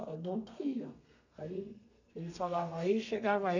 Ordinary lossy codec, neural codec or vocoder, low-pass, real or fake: none; codec, 32 kHz, 1.9 kbps, SNAC; 7.2 kHz; fake